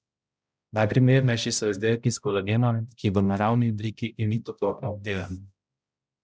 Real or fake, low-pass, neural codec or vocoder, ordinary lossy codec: fake; none; codec, 16 kHz, 0.5 kbps, X-Codec, HuBERT features, trained on balanced general audio; none